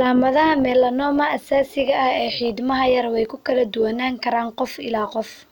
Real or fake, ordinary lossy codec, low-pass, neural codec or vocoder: real; none; 19.8 kHz; none